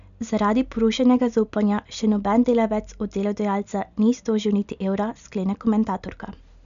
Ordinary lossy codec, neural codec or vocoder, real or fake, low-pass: none; none; real; 7.2 kHz